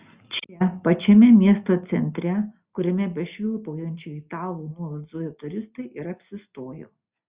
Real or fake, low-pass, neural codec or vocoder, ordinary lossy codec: real; 3.6 kHz; none; Opus, 64 kbps